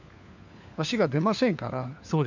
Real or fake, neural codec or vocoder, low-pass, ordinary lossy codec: fake; codec, 16 kHz, 4 kbps, FunCodec, trained on LibriTTS, 50 frames a second; 7.2 kHz; none